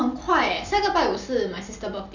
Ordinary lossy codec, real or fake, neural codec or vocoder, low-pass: none; real; none; 7.2 kHz